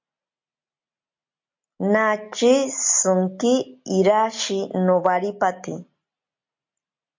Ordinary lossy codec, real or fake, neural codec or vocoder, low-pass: MP3, 48 kbps; real; none; 7.2 kHz